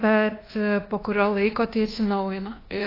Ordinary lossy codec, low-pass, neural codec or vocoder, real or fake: AAC, 24 kbps; 5.4 kHz; codec, 24 kHz, 1.2 kbps, DualCodec; fake